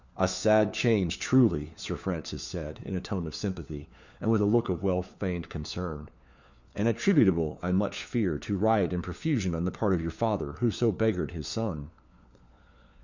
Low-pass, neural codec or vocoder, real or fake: 7.2 kHz; codec, 16 kHz, 4 kbps, FunCodec, trained on LibriTTS, 50 frames a second; fake